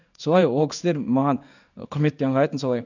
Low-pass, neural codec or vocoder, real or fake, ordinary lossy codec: 7.2 kHz; codec, 16 kHz in and 24 kHz out, 1 kbps, XY-Tokenizer; fake; none